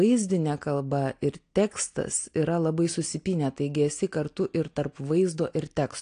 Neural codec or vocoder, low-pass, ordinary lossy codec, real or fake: none; 9.9 kHz; AAC, 48 kbps; real